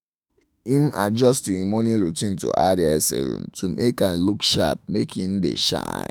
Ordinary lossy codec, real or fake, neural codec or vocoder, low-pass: none; fake; autoencoder, 48 kHz, 32 numbers a frame, DAC-VAE, trained on Japanese speech; none